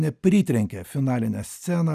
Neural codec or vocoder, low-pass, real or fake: none; 14.4 kHz; real